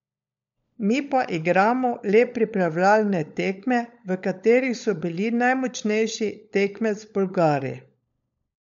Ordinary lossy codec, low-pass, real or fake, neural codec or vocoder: MP3, 64 kbps; 7.2 kHz; fake; codec, 16 kHz, 16 kbps, FunCodec, trained on LibriTTS, 50 frames a second